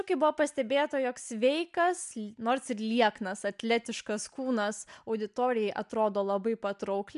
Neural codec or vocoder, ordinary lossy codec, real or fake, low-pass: none; MP3, 96 kbps; real; 10.8 kHz